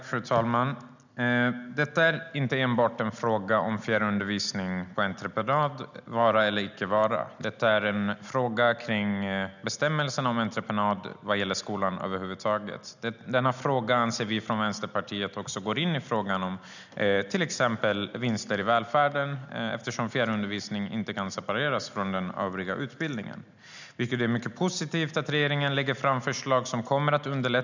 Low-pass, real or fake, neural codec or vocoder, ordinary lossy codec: 7.2 kHz; real; none; none